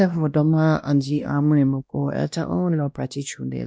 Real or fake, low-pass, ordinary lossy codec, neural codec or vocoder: fake; none; none; codec, 16 kHz, 1 kbps, X-Codec, WavLM features, trained on Multilingual LibriSpeech